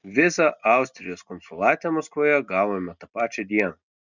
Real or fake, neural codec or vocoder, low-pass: real; none; 7.2 kHz